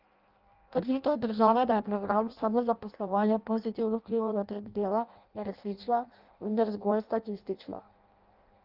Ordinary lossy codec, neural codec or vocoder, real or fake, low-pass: Opus, 24 kbps; codec, 16 kHz in and 24 kHz out, 0.6 kbps, FireRedTTS-2 codec; fake; 5.4 kHz